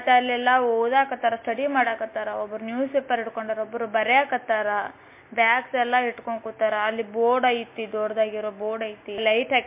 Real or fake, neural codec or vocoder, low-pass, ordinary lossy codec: real; none; 3.6 kHz; MP3, 24 kbps